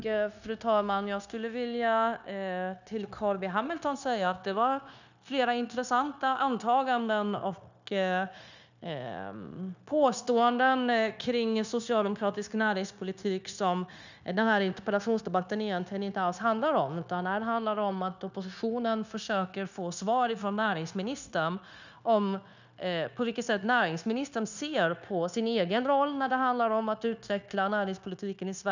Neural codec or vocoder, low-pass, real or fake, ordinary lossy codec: codec, 16 kHz, 0.9 kbps, LongCat-Audio-Codec; 7.2 kHz; fake; none